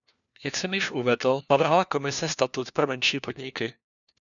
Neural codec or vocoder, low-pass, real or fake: codec, 16 kHz, 1 kbps, FunCodec, trained on LibriTTS, 50 frames a second; 7.2 kHz; fake